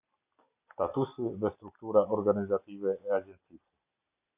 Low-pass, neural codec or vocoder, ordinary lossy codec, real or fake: 3.6 kHz; none; Opus, 64 kbps; real